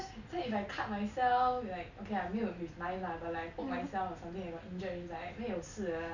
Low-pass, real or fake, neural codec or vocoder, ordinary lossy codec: 7.2 kHz; real; none; none